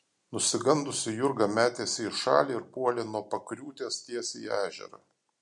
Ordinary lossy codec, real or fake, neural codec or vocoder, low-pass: MP3, 64 kbps; real; none; 10.8 kHz